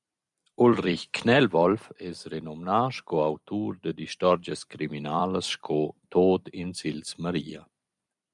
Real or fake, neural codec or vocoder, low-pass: fake; vocoder, 44.1 kHz, 128 mel bands every 256 samples, BigVGAN v2; 10.8 kHz